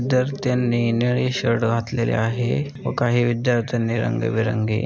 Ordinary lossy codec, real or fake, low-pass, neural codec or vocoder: none; real; none; none